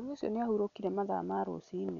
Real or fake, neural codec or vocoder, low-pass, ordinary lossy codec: real; none; 7.2 kHz; none